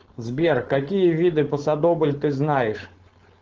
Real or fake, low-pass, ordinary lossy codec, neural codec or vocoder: fake; 7.2 kHz; Opus, 16 kbps; codec, 16 kHz, 4.8 kbps, FACodec